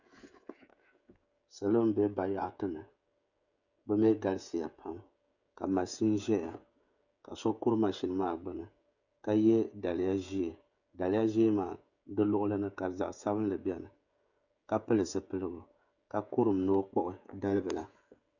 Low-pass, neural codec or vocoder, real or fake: 7.2 kHz; codec, 16 kHz, 16 kbps, FreqCodec, smaller model; fake